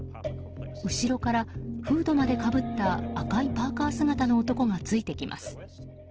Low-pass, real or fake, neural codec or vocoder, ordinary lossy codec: 7.2 kHz; real; none; Opus, 16 kbps